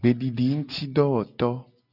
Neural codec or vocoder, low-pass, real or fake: none; 5.4 kHz; real